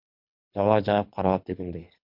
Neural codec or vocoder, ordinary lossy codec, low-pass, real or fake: codec, 24 kHz, 3 kbps, HILCodec; MP3, 48 kbps; 5.4 kHz; fake